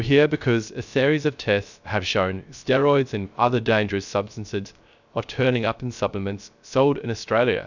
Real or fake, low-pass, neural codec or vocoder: fake; 7.2 kHz; codec, 16 kHz, 0.3 kbps, FocalCodec